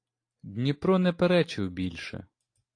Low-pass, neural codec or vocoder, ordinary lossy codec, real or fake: 9.9 kHz; none; AAC, 48 kbps; real